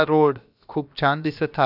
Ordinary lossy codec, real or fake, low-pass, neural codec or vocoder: none; fake; 5.4 kHz; codec, 16 kHz, about 1 kbps, DyCAST, with the encoder's durations